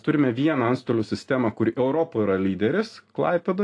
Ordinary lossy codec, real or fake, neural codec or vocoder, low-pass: AAC, 48 kbps; real; none; 10.8 kHz